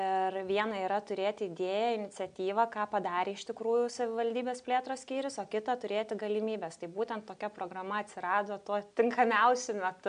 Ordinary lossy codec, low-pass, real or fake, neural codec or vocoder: AAC, 96 kbps; 9.9 kHz; real; none